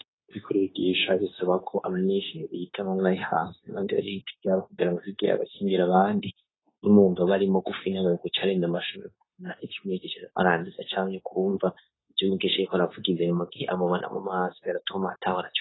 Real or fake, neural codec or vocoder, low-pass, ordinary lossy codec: fake; codec, 16 kHz, 0.9 kbps, LongCat-Audio-Codec; 7.2 kHz; AAC, 16 kbps